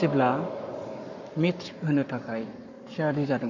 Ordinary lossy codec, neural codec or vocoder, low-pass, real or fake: none; codec, 44.1 kHz, 7.8 kbps, Pupu-Codec; 7.2 kHz; fake